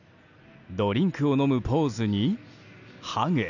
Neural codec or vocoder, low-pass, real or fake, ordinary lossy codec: none; 7.2 kHz; real; none